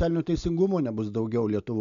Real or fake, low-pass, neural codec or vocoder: real; 7.2 kHz; none